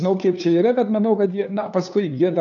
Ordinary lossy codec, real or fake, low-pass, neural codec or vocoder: MP3, 96 kbps; fake; 7.2 kHz; codec, 16 kHz, 2 kbps, FunCodec, trained on LibriTTS, 25 frames a second